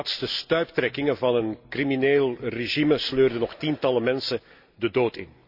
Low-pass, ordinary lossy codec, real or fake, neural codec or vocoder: 5.4 kHz; none; real; none